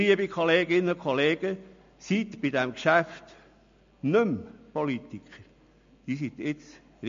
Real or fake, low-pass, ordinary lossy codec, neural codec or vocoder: real; 7.2 kHz; MP3, 48 kbps; none